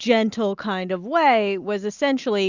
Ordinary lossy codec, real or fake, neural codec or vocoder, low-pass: Opus, 64 kbps; real; none; 7.2 kHz